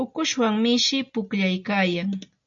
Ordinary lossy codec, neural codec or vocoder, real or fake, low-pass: MP3, 64 kbps; none; real; 7.2 kHz